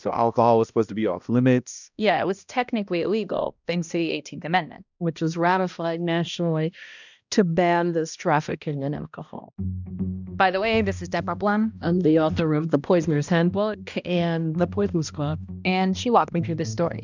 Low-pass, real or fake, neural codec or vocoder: 7.2 kHz; fake; codec, 16 kHz, 1 kbps, X-Codec, HuBERT features, trained on balanced general audio